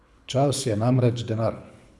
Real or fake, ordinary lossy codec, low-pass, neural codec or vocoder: fake; none; none; codec, 24 kHz, 6 kbps, HILCodec